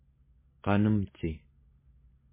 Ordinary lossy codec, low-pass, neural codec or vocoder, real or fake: MP3, 16 kbps; 3.6 kHz; none; real